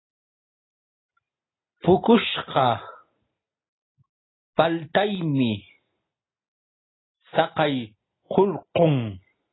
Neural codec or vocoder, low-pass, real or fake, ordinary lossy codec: none; 7.2 kHz; real; AAC, 16 kbps